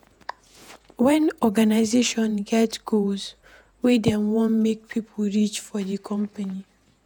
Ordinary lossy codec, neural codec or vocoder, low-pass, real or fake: none; vocoder, 48 kHz, 128 mel bands, Vocos; none; fake